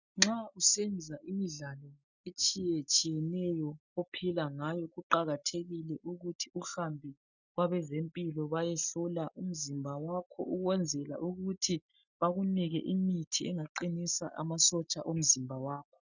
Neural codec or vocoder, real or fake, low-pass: none; real; 7.2 kHz